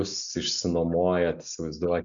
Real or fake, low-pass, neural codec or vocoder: real; 7.2 kHz; none